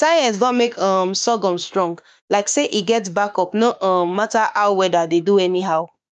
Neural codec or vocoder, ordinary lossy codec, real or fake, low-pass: autoencoder, 48 kHz, 32 numbers a frame, DAC-VAE, trained on Japanese speech; none; fake; 10.8 kHz